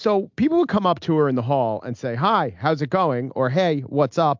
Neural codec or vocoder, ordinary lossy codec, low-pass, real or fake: none; MP3, 64 kbps; 7.2 kHz; real